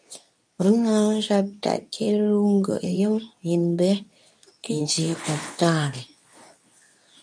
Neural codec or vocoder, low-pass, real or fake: codec, 24 kHz, 0.9 kbps, WavTokenizer, medium speech release version 2; 9.9 kHz; fake